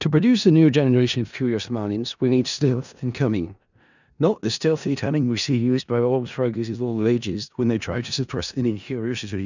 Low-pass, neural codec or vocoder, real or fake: 7.2 kHz; codec, 16 kHz in and 24 kHz out, 0.4 kbps, LongCat-Audio-Codec, four codebook decoder; fake